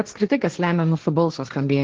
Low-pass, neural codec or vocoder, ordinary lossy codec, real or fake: 7.2 kHz; codec, 16 kHz, 1.1 kbps, Voila-Tokenizer; Opus, 16 kbps; fake